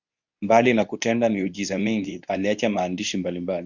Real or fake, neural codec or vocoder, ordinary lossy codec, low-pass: fake; codec, 24 kHz, 0.9 kbps, WavTokenizer, medium speech release version 2; Opus, 64 kbps; 7.2 kHz